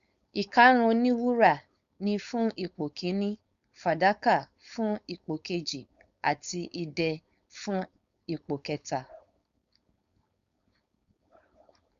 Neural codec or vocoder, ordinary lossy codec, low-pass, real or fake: codec, 16 kHz, 4.8 kbps, FACodec; Opus, 64 kbps; 7.2 kHz; fake